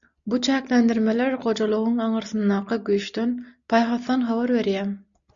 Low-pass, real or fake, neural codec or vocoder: 7.2 kHz; real; none